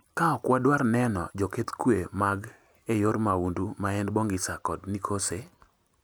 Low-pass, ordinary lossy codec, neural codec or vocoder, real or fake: none; none; none; real